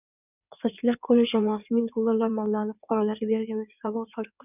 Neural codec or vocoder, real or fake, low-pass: codec, 16 kHz in and 24 kHz out, 2.2 kbps, FireRedTTS-2 codec; fake; 3.6 kHz